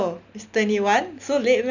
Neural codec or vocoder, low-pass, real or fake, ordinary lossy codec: none; 7.2 kHz; real; none